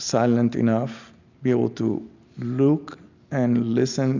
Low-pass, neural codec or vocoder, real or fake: 7.2 kHz; none; real